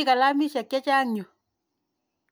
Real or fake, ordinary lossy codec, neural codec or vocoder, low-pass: real; none; none; none